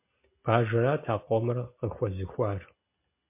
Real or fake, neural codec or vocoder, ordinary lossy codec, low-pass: real; none; MP3, 24 kbps; 3.6 kHz